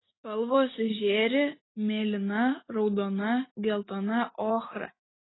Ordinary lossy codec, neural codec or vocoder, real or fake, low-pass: AAC, 16 kbps; none; real; 7.2 kHz